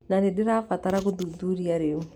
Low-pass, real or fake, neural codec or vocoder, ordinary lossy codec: 19.8 kHz; real; none; none